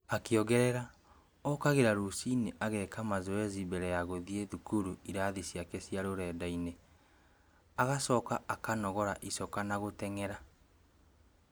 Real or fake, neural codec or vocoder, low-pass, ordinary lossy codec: real; none; none; none